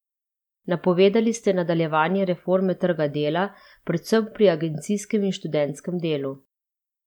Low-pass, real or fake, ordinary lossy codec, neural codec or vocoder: 19.8 kHz; real; MP3, 96 kbps; none